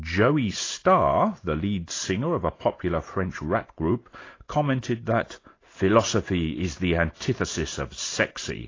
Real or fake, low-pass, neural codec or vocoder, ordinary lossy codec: real; 7.2 kHz; none; AAC, 32 kbps